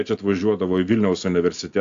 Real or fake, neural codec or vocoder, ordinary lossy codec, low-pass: real; none; AAC, 64 kbps; 7.2 kHz